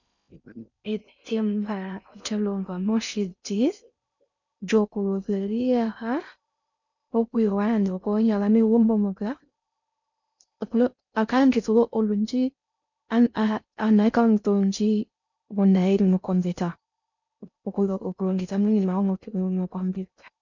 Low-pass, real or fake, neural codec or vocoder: 7.2 kHz; fake; codec, 16 kHz in and 24 kHz out, 0.6 kbps, FocalCodec, streaming, 4096 codes